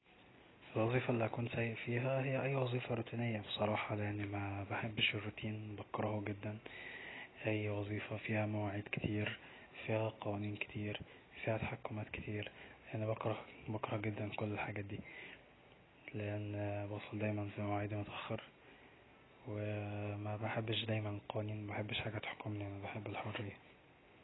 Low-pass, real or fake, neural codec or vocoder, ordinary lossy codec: 7.2 kHz; real; none; AAC, 16 kbps